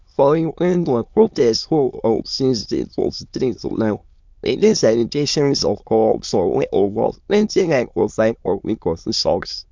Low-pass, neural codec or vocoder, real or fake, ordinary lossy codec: 7.2 kHz; autoencoder, 22.05 kHz, a latent of 192 numbers a frame, VITS, trained on many speakers; fake; MP3, 64 kbps